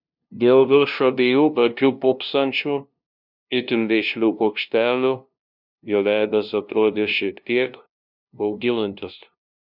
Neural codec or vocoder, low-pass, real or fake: codec, 16 kHz, 0.5 kbps, FunCodec, trained on LibriTTS, 25 frames a second; 5.4 kHz; fake